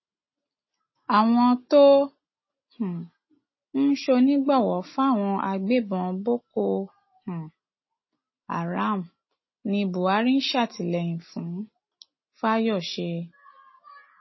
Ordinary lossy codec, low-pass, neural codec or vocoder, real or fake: MP3, 24 kbps; 7.2 kHz; none; real